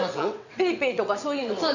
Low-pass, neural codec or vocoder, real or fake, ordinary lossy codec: 7.2 kHz; vocoder, 22.05 kHz, 80 mel bands, WaveNeXt; fake; none